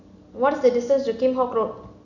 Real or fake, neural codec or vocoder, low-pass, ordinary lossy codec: real; none; 7.2 kHz; none